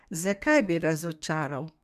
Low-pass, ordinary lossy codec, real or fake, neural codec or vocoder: 14.4 kHz; none; fake; codec, 32 kHz, 1.9 kbps, SNAC